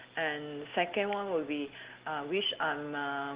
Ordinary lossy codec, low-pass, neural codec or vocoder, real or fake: Opus, 16 kbps; 3.6 kHz; none; real